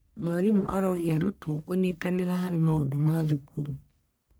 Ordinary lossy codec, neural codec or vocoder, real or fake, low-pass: none; codec, 44.1 kHz, 1.7 kbps, Pupu-Codec; fake; none